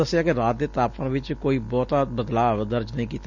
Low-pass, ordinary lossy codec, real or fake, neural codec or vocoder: 7.2 kHz; none; real; none